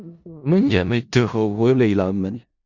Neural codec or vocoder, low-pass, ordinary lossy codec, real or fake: codec, 16 kHz in and 24 kHz out, 0.4 kbps, LongCat-Audio-Codec, four codebook decoder; 7.2 kHz; Opus, 64 kbps; fake